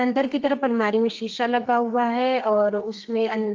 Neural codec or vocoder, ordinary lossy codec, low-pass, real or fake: codec, 32 kHz, 1.9 kbps, SNAC; Opus, 24 kbps; 7.2 kHz; fake